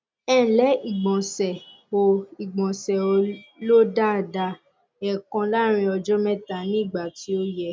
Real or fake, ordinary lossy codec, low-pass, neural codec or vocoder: real; none; none; none